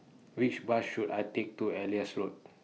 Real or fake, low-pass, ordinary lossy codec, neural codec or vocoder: real; none; none; none